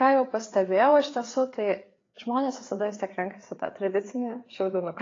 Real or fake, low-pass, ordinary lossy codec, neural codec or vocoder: fake; 7.2 kHz; AAC, 32 kbps; codec, 16 kHz, 4 kbps, FreqCodec, larger model